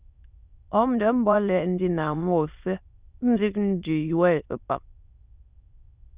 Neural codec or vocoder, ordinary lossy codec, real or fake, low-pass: autoencoder, 22.05 kHz, a latent of 192 numbers a frame, VITS, trained on many speakers; Opus, 64 kbps; fake; 3.6 kHz